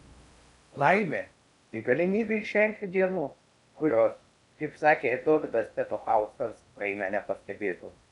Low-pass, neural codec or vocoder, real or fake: 10.8 kHz; codec, 16 kHz in and 24 kHz out, 0.6 kbps, FocalCodec, streaming, 4096 codes; fake